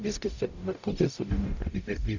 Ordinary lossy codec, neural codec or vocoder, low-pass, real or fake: Opus, 64 kbps; codec, 44.1 kHz, 0.9 kbps, DAC; 7.2 kHz; fake